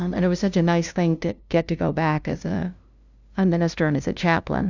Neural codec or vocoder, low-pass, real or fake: codec, 16 kHz, 0.5 kbps, FunCodec, trained on LibriTTS, 25 frames a second; 7.2 kHz; fake